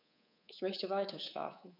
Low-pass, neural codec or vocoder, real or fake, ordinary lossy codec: 5.4 kHz; codec, 24 kHz, 3.1 kbps, DualCodec; fake; none